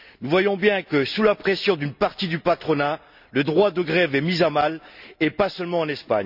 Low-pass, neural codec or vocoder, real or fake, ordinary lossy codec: 5.4 kHz; none; real; none